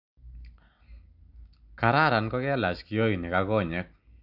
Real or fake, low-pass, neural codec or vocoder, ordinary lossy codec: real; 5.4 kHz; none; none